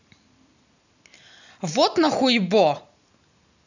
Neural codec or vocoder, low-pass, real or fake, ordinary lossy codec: none; 7.2 kHz; real; none